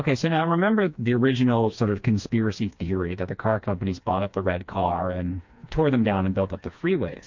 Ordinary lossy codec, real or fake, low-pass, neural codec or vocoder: MP3, 48 kbps; fake; 7.2 kHz; codec, 16 kHz, 2 kbps, FreqCodec, smaller model